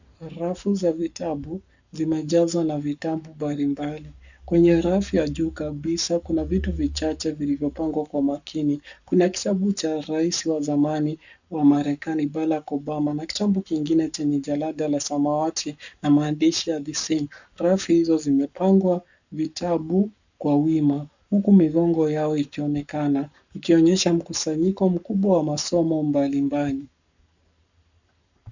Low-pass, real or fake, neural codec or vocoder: 7.2 kHz; fake; codec, 44.1 kHz, 7.8 kbps, Pupu-Codec